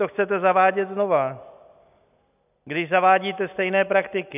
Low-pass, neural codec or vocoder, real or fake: 3.6 kHz; none; real